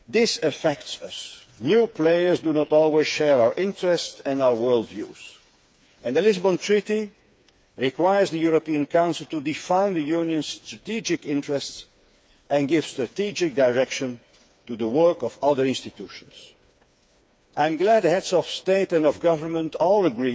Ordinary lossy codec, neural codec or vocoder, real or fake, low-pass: none; codec, 16 kHz, 4 kbps, FreqCodec, smaller model; fake; none